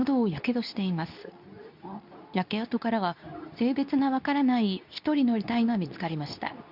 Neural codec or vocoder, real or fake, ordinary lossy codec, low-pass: codec, 24 kHz, 0.9 kbps, WavTokenizer, medium speech release version 2; fake; none; 5.4 kHz